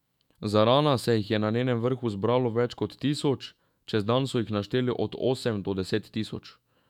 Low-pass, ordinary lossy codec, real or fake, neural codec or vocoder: 19.8 kHz; none; fake; autoencoder, 48 kHz, 128 numbers a frame, DAC-VAE, trained on Japanese speech